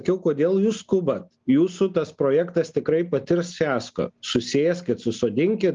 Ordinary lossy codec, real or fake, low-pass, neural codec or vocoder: Opus, 24 kbps; real; 7.2 kHz; none